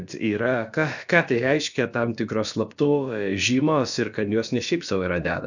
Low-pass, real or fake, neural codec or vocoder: 7.2 kHz; fake; codec, 16 kHz, about 1 kbps, DyCAST, with the encoder's durations